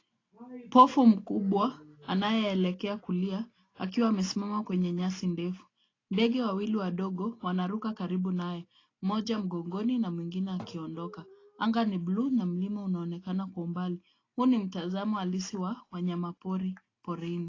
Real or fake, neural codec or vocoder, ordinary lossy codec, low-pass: real; none; AAC, 32 kbps; 7.2 kHz